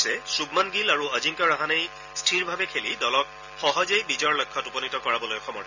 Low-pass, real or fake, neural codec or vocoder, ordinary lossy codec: 7.2 kHz; real; none; none